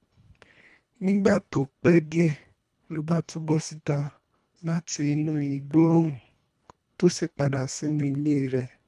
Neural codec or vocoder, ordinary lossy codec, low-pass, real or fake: codec, 24 kHz, 1.5 kbps, HILCodec; none; none; fake